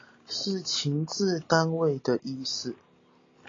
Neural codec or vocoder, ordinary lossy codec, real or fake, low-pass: none; AAC, 32 kbps; real; 7.2 kHz